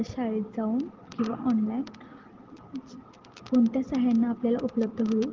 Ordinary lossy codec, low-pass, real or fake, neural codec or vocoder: Opus, 16 kbps; 7.2 kHz; real; none